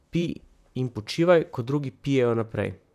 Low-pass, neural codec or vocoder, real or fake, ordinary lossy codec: 14.4 kHz; vocoder, 44.1 kHz, 128 mel bands, Pupu-Vocoder; fake; none